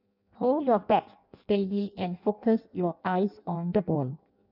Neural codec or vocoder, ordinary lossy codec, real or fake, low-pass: codec, 16 kHz in and 24 kHz out, 0.6 kbps, FireRedTTS-2 codec; none; fake; 5.4 kHz